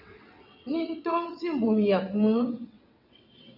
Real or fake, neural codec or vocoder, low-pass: fake; vocoder, 22.05 kHz, 80 mel bands, WaveNeXt; 5.4 kHz